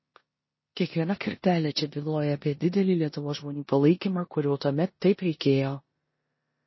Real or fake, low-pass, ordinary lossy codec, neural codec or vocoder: fake; 7.2 kHz; MP3, 24 kbps; codec, 16 kHz in and 24 kHz out, 0.9 kbps, LongCat-Audio-Codec, four codebook decoder